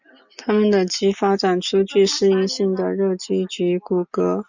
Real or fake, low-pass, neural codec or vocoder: real; 7.2 kHz; none